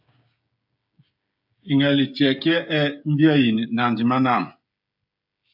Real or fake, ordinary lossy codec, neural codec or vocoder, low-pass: fake; AAC, 48 kbps; codec, 16 kHz, 16 kbps, FreqCodec, smaller model; 5.4 kHz